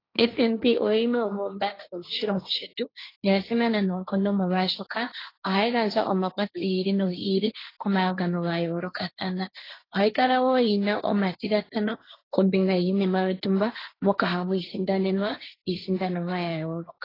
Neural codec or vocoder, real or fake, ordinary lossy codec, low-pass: codec, 16 kHz, 1.1 kbps, Voila-Tokenizer; fake; AAC, 24 kbps; 5.4 kHz